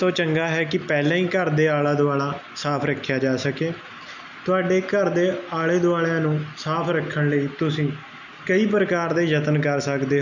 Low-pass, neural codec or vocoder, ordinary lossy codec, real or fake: 7.2 kHz; none; none; real